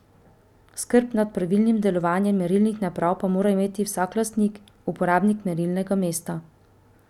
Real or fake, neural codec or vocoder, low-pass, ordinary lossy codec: real; none; 19.8 kHz; none